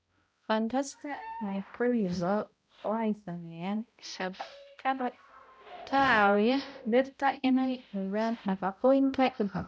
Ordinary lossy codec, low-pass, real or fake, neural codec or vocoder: none; none; fake; codec, 16 kHz, 0.5 kbps, X-Codec, HuBERT features, trained on balanced general audio